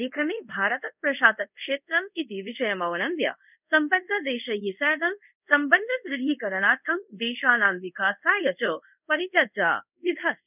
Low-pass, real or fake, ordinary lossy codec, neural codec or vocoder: 3.6 kHz; fake; none; codec, 24 kHz, 0.5 kbps, DualCodec